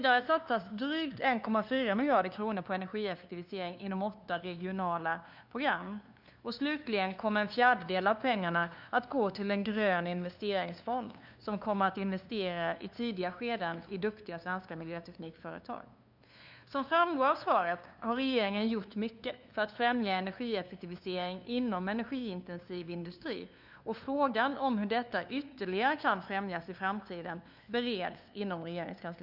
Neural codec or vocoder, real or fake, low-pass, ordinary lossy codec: codec, 16 kHz, 2 kbps, FunCodec, trained on LibriTTS, 25 frames a second; fake; 5.4 kHz; none